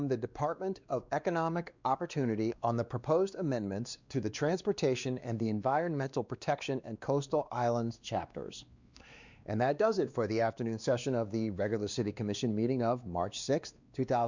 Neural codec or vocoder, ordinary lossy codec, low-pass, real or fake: codec, 16 kHz, 2 kbps, X-Codec, WavLM features, trained on Multilingual LibriSpeech; Opus, 64 kbps; 7.2 kHz; fake